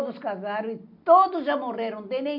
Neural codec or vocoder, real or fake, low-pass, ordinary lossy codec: none; real; 5.4 kHz; none